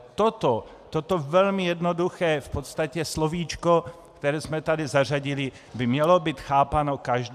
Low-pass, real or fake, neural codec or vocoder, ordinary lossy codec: 14.4 kHz; real; none; AAC, 96 kbps